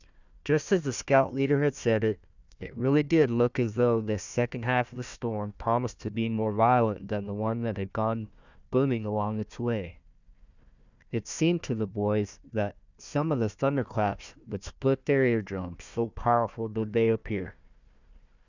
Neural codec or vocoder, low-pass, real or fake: codec, 16 kHz, 1 kbps, FunCodec, trained on Chinese and English, 50 frames a second; 7.2 kHz; fake